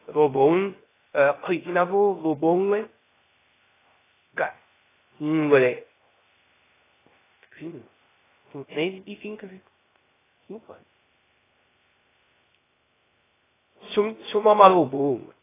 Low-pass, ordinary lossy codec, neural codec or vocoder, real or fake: 3.6 kHz; AAC, 16 kbps; codec, 16 kHz, 0.3 kbps, FocalCodec; fake